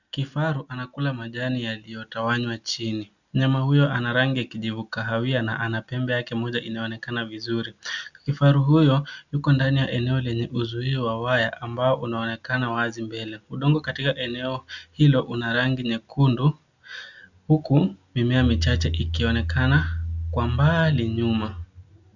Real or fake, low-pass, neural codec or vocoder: real; 7.2 kHz; none